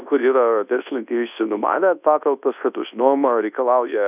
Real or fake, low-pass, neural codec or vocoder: fake; 3.6 kHz; codec, 24 kHz, 0.9 kbps, WavTokenizer, large speech release